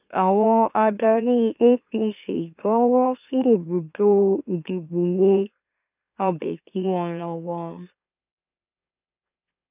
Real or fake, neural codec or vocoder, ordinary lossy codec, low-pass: fake; autoencoder, 44.1 kHz, a latent of 192 numbers a frame, MeloTTS; none; 3.6 kHz